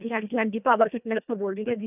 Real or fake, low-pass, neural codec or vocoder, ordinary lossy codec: fake; 3.6 kHz; codec, 24 kHz, 1.5 kbps, HILCodec; none